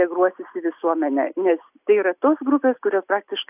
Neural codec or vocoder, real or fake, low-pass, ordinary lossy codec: none; real; 3.6 kHz; AAC, 32 kbps